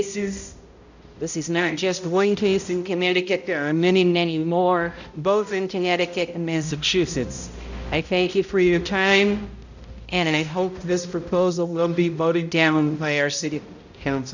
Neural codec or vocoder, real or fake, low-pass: codec, 16 kHz, 0.5 kbps, X-Codec, HuBERT features, trained on balanced general audio; fake; 7.2 kHz